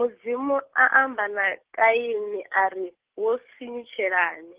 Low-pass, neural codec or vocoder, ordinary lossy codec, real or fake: 3.6 kHz; codec, 44.1 kHz, 7.8 kbps, DAC; Opus, 16 kbps; fake